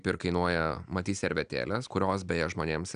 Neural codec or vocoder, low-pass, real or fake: none; 9.9 kHz; real